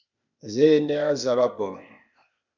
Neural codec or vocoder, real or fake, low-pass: codec, 16 kHz, 0.8 kbps, ZipCodec; fake; 7.2 kHz